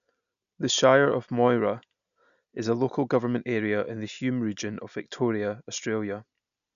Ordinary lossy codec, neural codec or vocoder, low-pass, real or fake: none; none; 7.2 kHz; real